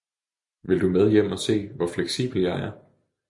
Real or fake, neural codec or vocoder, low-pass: real; none; 10.8 kHz